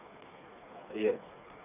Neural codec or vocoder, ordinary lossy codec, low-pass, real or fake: codec, 16 kHz, 4 kbps, FreqCodec, smaller model; none; 3.6 kHz; fake